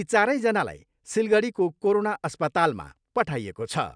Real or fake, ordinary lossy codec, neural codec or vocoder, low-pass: real; none; none; 9.9 kHz